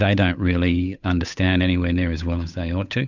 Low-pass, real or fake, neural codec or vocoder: 7.2 kHz; fake; codec, 16 kHz, 8 kbps, FunCodec, trained on Chinese and English, 25 frames a second